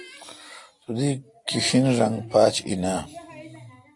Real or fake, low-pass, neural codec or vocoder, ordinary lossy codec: real; 10.8 kHz; none; AAC, 48 kbps